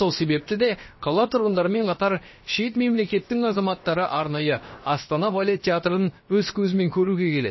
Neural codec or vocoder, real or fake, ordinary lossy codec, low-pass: codec, 16 kHz, about 1 kbps, DyCAST, with the encoder's durations; fake; MP3, 24 kbps; 7.2 kHz